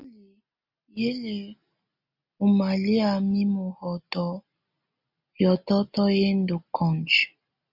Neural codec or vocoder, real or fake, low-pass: none; real; 5.4 kHz